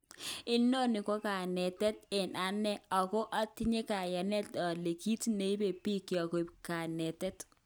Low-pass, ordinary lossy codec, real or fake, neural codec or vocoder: none; none; real; none